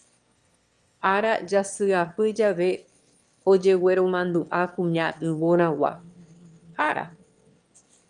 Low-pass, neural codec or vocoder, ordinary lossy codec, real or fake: 9.9 kHz; autoencoder, 22.05 kHz, a latent of 192 numbers a frame, VITS, trained on one speaker; Opus, 32 kbps; fake